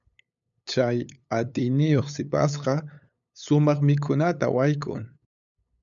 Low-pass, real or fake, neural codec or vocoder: 7.2 kHz; fake; codec, 16 kHz, 8 kbps, FunCodec, trained on LibriTTS, 25 frames a second